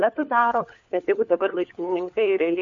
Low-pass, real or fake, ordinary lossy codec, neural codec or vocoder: 7.2 kHz; fake; MP3, 48 kbps; codec, 16 kHz, 4 kbps, FunCodec, trained on LibriTTS, 50 frames a second